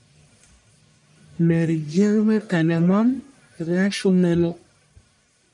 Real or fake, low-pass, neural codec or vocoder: fake; 10.8 kHz; codec, 44.1 kHz, 1.7 kbps, Pupu-Codec